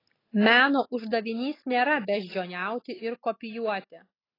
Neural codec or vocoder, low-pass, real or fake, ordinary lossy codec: none; 5.4 kHz; real; AAC, 24 kbps